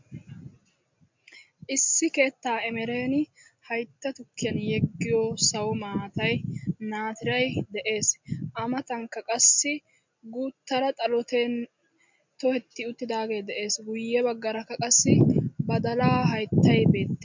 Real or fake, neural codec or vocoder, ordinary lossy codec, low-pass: real; none; MP3, 64 kbps; 7.2 kHz